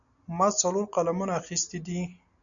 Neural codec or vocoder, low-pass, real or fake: none; 7.2 kHz; real